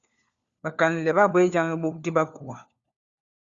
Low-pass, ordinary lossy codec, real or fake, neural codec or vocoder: 7.2 kHz; Opus, 64 kbps; fake; codec, 16 kHz, 4 kbps, FunCodec, trained on LibriTTS, 50 frames a second